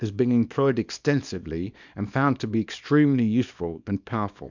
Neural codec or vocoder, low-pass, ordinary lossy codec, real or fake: codec, 24 kHz, 0.9 kbps, WavTokenizer, small release; 7.2 kHz; MP3, 64 kbps; fake